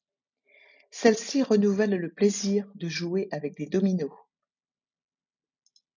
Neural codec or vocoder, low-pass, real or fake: none; 7.2 kHz; real